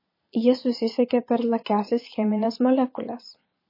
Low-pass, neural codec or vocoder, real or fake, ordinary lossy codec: 5.4 kHz; vocoder, 22.05 kHz, 80 mel bands, Vocos; fake; MP3, 24 kbps